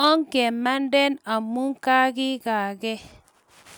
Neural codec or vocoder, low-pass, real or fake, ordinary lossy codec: none; none; real; none